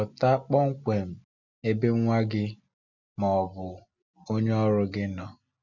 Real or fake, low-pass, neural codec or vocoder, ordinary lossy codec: real; 7.2 kHz; none; none